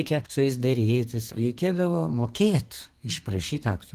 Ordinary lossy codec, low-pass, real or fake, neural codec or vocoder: Opus, 24 kbps; 14.4 kHz; fake; codec, 32 kHz, 1.9 kbps, SNAC